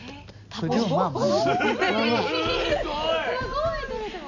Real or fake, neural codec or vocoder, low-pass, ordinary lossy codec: real; none; 7.2 kHz; none